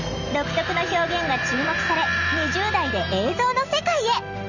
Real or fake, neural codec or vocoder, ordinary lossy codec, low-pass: real; none; none; 7.2 kHz